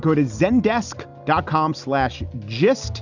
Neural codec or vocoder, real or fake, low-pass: none; real; 7.2 kHz